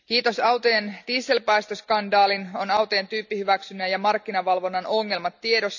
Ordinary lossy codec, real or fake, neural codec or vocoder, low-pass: none; real; none; 7.2 kHz